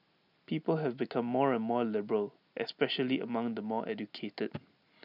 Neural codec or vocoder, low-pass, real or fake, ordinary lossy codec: none; 5.4 kHz; real; none